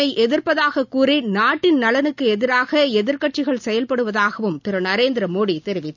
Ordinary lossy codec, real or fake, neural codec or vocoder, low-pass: none; real; none; 7.2 kHz